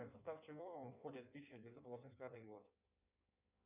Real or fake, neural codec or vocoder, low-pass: fake; codec, 16 kHz in and 24 kHz out, 1.1 kbps, FireRedTTS-2 codec; 3.6 kHz